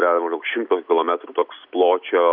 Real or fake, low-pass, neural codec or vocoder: real; 5.4 kHz; none